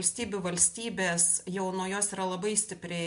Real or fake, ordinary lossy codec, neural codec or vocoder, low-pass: real; MP3, 64 kbps; none; 10.8 kHz